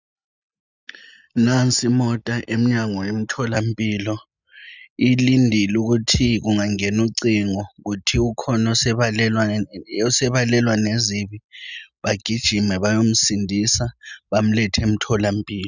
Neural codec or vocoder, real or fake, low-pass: none; real; 7.2 kHz